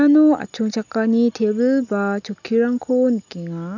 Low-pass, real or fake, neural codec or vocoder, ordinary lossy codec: 7.2 kHz; real; none; none